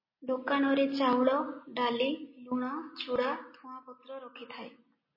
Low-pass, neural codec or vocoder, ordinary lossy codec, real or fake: 5.4 kHz; none; MP3, 24 kbps; real